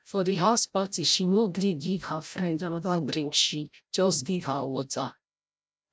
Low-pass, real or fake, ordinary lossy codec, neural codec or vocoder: none; fake; none; codec, 16 kHz, 0.5 kbps, FreqCodec, larger model